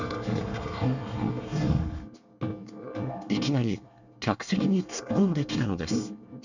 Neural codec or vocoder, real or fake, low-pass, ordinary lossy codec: codec, 24 kHz, 1 kbps, SNAC; fake; 7.2 kHz; none